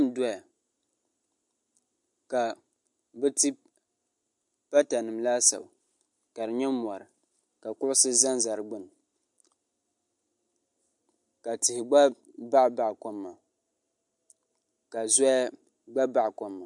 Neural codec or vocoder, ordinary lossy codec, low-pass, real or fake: none; MP3, 64 kbps; 10.8 kHz; real